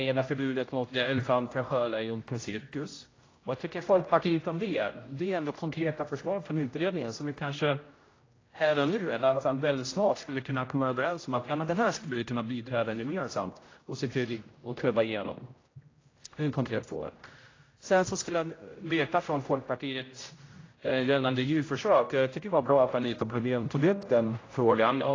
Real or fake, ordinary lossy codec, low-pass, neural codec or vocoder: fake; AAC, 32 kbps; 7.2 kHz; codec, 16 kHz, 0.5 kbps, X-Codec, HuBERT features, trained on general audio